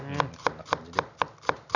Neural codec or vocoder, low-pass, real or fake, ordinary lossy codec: none; 7.2 kHz; real; none